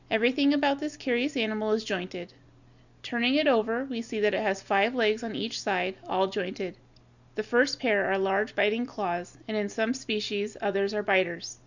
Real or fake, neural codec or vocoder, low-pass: real; none; 7.2 kHz